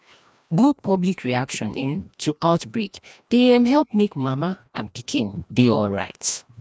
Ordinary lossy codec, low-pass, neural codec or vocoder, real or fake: none; none; codec, 16 kHz, 1 kbps, FreqCodec, larger model; fake